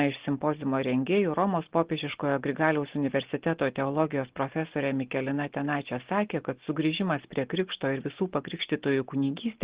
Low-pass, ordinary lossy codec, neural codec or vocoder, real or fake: 3.6 kHz; Opus, 16 kbps; none; real